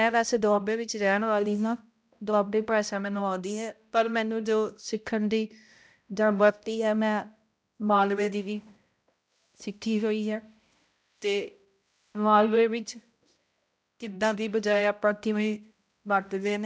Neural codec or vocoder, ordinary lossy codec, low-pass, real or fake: codec, 16 kHz, 0.5 kbps, X-Codec, HuBERT features, trained on balanced general audio; none; none; fake